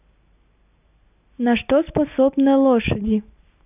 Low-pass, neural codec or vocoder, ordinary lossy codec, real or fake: 3.6 kHz; none; none; real